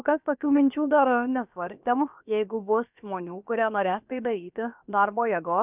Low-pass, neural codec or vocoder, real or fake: 3.6 kHz; codec, 16 kHz, about 1 kbps, DyCAST, with the encoder's durations; fake